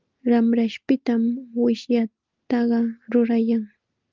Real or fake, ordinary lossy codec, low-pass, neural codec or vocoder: real; Opus, 32 kbps; 7.2 kHz; none